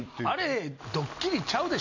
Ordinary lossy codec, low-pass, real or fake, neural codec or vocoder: none; 7.2 kHz; real; none